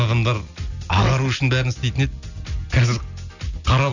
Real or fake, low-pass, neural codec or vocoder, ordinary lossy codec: real; 7.2 kHz; none; none